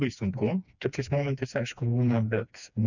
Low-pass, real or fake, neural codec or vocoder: 7.2 kHz; fake; codec, 16 kHz, 2 kbps, FreqCodec, smaller model